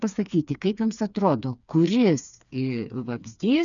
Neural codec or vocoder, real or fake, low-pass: codec, 16 kHz, 4 kbps, FreqCodec, smaller model; fake; 7.2 kHz